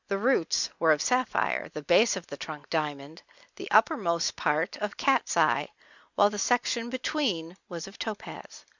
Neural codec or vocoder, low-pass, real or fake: none; 7.2 kHz; real